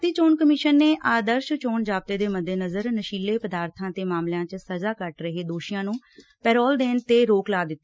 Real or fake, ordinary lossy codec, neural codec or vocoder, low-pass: real; none; none; none